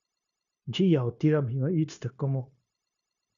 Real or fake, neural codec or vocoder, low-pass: fake; codec, 16 kHz, 0.9 kbps, LongCat-Audio-Codec; 7.2 kHz